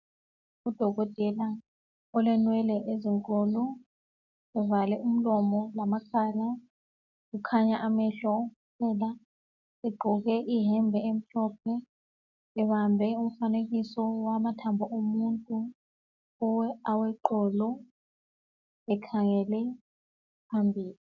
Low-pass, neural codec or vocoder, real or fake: 7.2 kHz; none; real